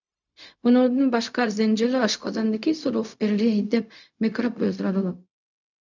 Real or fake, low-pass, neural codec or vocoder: fake; 7.2 kHz; codec, 16 kHz, 0.4 kbps, LongCat-Audio-Codec